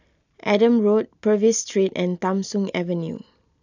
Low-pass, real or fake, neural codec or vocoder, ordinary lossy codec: 7.2 kHz; real; none; none